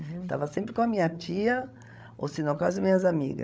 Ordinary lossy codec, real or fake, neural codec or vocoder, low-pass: none; fake; codec, 16 kHz, 16 kbps, FreqCodec, larger model; none